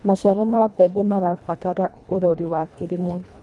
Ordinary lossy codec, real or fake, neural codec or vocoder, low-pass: none; fake; codec, 24 kHz, 1.5 kbps, HILCodec; none